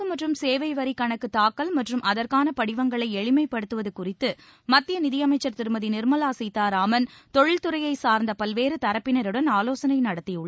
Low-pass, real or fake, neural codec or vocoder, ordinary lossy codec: none; real; none; none